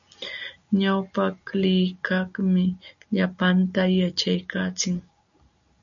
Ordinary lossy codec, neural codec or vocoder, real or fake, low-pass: MP3, 96 kbps; none; real; 7.2 kHz